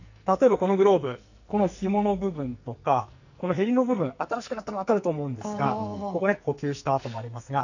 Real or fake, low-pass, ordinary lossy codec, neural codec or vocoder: fake; 7.2 kHz; none; codec, 44.1 kHz, 2.6 kbps, SNAC